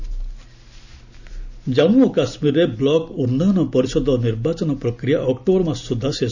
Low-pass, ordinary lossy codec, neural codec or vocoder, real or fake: 7.2 kHz; none; none; real